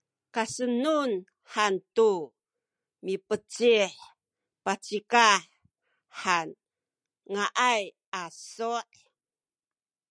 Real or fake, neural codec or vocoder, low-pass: real; none; 9.9 kHz